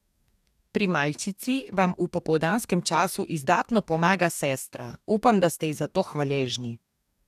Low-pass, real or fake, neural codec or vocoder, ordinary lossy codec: 14.4 kHz; fake; codec, 44.1 kHz, 2.6 kbps, DAC; none